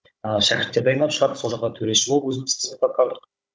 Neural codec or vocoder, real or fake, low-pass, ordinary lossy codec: codec, 16 kHz, 16 kbps, FunCodec, trained on Chinese and English, 50 frames a second; fake; none; none